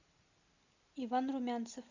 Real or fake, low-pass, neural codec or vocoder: real; 7.2 kHz; none